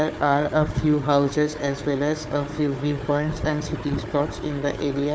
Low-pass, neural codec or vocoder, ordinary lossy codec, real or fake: none; codec, 16 kHz, 4 kbps, FunCodec, trained on Chinese and English, 50 frames a second; none; fake